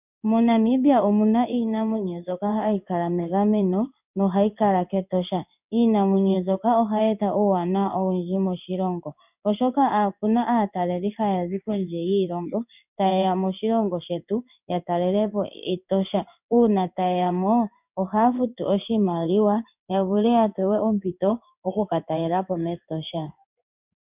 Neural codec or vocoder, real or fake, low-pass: codec, 16 kHz in and 24 kHz out, 1 kbps, XY-Tokenizer; fake; 3.6 kHz